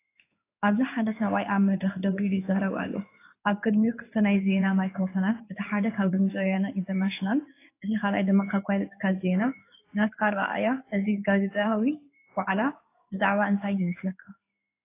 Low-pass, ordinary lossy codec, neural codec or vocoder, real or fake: 3.6 kHz; AAC, 24 kbps; codec, 16 kHz in and 24 kHz out, 1 kbps, XY-Tokenizer; fake